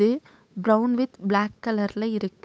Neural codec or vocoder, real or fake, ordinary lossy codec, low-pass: codec, 16 kHz, 8 kbps, FunCodec, trained on Chinese and English, 25 frames a second; fake; none; none